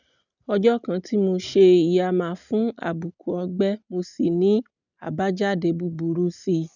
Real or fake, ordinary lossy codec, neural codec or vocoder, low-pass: real; none; none; 7.2 kHz